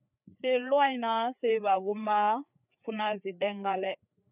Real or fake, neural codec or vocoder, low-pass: fake; codec, 16 kHz, 4 kbps, FreqCodec, larger model; 3.6 kHz